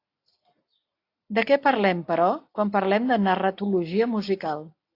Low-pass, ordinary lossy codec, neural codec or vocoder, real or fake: 5.4 kHz; AAC, 32 kbps; none; real